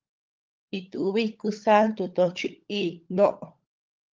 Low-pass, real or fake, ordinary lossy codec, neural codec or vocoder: 7.2 kHz; fake; Opus, 32 kbps; codec, 16 kHz, 16 kbps, FunCodec, trained on LibriTTS, 50 frames a second